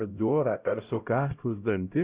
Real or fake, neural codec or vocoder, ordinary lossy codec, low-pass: fake; codec, 16 kHz, 0.5 kbps, X-Codec, HuBERT features, trained on LibriSpeech; Opus, 64 kbps; 3.6 kHz